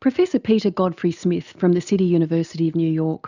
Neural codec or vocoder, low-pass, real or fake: none; 7.2 kHz; real